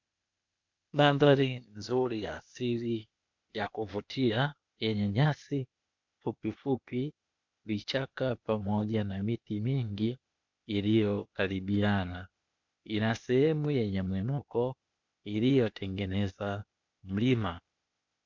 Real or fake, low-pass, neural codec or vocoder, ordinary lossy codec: fake; 7.2 kHz; codec, 16 kHz, 0.8 kbps, ZipCodec; MP3, 64 kbps